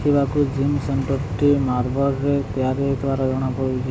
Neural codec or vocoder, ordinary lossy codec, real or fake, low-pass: none; none; real; none